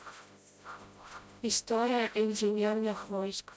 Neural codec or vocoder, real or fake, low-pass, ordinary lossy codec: codec, 16 kHz, 0.5 kbps, FreqCodec, smaller model; fake; none; none